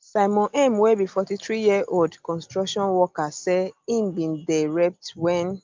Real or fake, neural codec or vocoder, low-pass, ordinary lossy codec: real; none; 7.2 kHz; Opus, 32 kbps